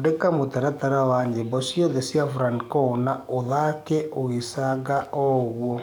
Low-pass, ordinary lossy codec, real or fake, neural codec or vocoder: 19.8 kHz; none; fake; codec, 44.1 kHz, 7.8 kbps, DAC